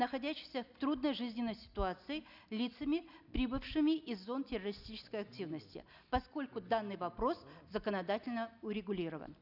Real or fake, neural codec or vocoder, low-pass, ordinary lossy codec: real; none; 5.4 kHz; none